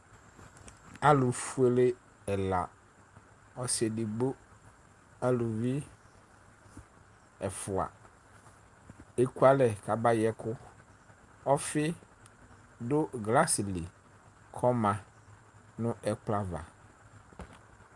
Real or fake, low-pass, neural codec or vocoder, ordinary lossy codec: real; 10.8 kHz; none; Opus, 32 kbps